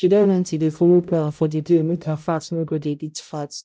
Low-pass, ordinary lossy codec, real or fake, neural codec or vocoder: none; none; fake; codec, 16 kHz, 0.5 kbps, X-Codec, HuBERT features, trained on balanced general audio